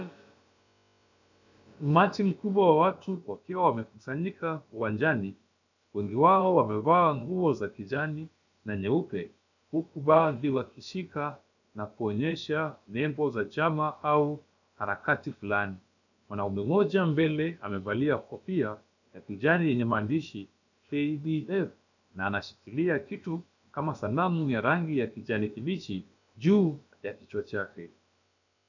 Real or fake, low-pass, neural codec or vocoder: fake; 7.2 kHz; codec, 16 kHz, about 1 kbps, DyCAST, with the encoder's durations